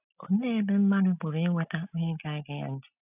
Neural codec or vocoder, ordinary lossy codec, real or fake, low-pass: none; none; real; 3.6 kHz